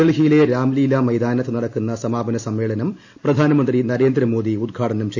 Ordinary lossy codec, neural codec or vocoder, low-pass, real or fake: AAC, 48 kbps; none; 7.2 kHz; real